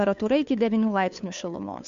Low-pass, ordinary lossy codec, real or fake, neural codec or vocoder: 7.2 kHz; AAC, 64 kbps; fake; codec, 16 kHz, 2 kbps, FunCodec, trained on Chinese and English, 25 frames a second